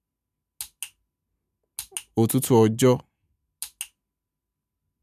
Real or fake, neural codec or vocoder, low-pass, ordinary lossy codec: real; none; 14.4 kHz; none